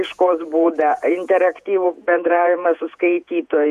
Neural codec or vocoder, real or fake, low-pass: vocoder, 48 kHz, 128 mel bands, Vocos; fake; 14.4 kHz